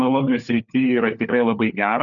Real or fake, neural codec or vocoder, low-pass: fake; codec, 16 kHz, 4 kbps, FunCodec, trained on LibriTTS, 50 frames a second; 7.2 kHz